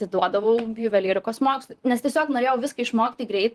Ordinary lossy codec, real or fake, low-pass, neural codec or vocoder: Opus, 24 kbps; fake; 14.4 kHz; vocoder, 44.1 kHz, 128 mel bands, Pupu-Vocoder